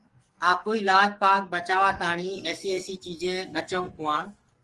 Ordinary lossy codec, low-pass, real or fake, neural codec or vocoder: Opus, 24 kbps; 10.8 kHz; fake; codec, 44.1 kHz, 2.6 kbps, SNAC